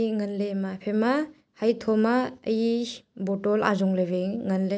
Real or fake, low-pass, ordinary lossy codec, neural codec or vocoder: real; none; none; none